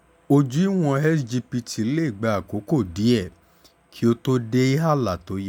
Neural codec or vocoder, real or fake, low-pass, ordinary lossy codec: none; real; 19.8 kHz; none